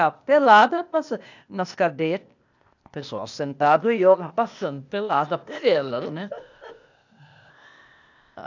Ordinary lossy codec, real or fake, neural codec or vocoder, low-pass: none; fake; codec, 16 kHz, 0.8 kbps, ZipCodec; 7.2 kHz